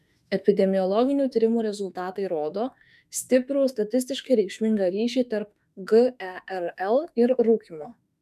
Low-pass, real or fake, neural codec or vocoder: 14.4 kHz; fake; autoencoder, 48 kHz, 32 numbers a frame, DAC-VAE, trained on Japanese speech